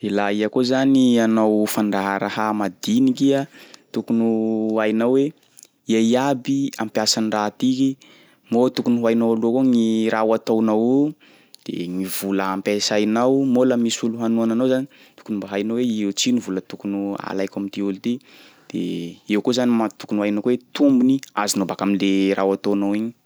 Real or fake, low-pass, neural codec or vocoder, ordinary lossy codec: real; none; none; none